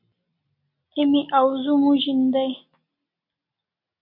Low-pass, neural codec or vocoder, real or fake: 5.4 kHz; none; real